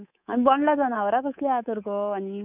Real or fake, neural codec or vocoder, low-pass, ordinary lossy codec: fake; codec, 24 kHz, 3.1 kbps, DualCodec; 3.6 kHz; none